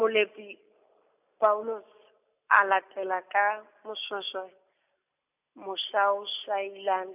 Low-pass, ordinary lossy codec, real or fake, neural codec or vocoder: 3.6 kHz; none; real; none